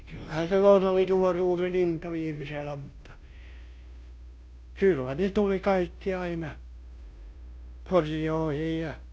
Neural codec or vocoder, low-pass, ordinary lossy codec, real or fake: codec, 16 kHz, 0.5 kbps, FunCodec, trained on Chinese and English, 25 frames a second; none; none; fake